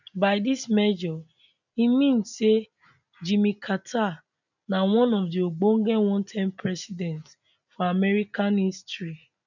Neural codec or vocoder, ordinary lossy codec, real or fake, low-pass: none; none; real; 7.2 kHz